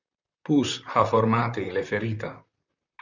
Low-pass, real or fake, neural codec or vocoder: 7.2 kHz; fake; vocoder, 44.1 kHz, 128 mel bands, Pupu-Vocoder